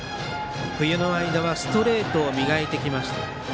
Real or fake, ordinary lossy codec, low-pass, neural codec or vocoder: real; none; none; none